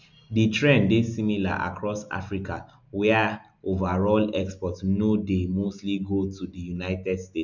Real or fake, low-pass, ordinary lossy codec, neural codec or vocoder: real; 7.2 kHz; none; none